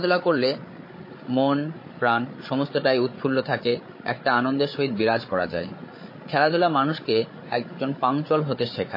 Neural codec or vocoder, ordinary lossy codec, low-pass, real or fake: codec, 16 kHz, 8 kbps, FreqCodec, larger model; MP3, 24 kbps; 5.4 kHz; fake